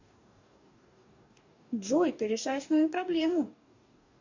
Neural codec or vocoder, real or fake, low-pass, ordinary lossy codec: codec, 44.1 kHz, 2.6 kbps, DAC; fake; 7.2 kHz; AAC, 48 kbps